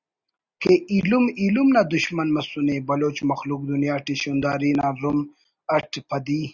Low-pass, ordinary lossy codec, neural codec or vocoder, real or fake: 7.2 kHz; Opus, 64 kbps; none; real